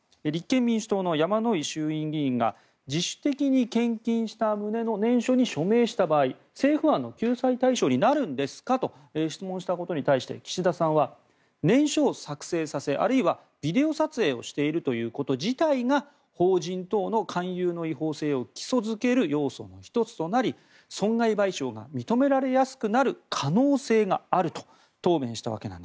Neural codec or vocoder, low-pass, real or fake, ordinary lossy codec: none; none; real; none